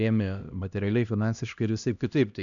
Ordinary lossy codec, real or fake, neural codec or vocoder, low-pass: MP3, 96 kbps; fake; codec, 16 kHz, 1 kbps, X-Codec, HuBERT features, trained on LibriSpeech; 7.2 kHz